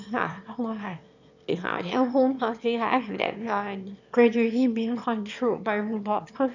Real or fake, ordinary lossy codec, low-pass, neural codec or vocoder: fake; none; 7.2 kHz; autoencoder, 22.05 kHz, a latent of 192 numbers a frame, VITS, trained on one speaker